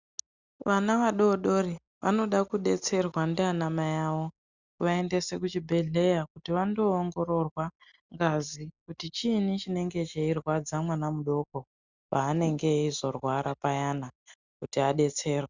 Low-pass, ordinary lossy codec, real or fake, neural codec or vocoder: 7.2 kHz; Opus, 64 kbps; real; none